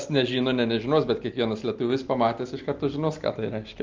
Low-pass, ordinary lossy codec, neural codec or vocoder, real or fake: 7.2 kHz; Opus, 24 kbps; none; real